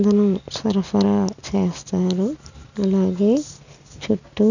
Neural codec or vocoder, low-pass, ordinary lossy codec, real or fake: none; 7.2 kHz; none; real